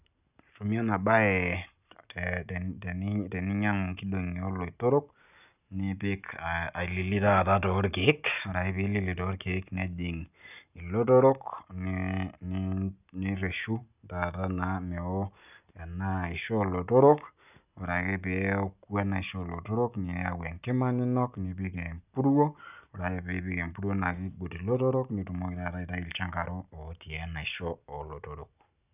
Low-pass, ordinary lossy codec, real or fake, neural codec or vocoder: 3.6 kHz; none; real; none